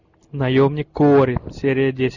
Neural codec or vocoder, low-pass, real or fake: none; 7.2 kHz; real